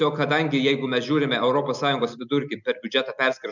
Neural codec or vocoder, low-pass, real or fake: none; 7.2 kHz; real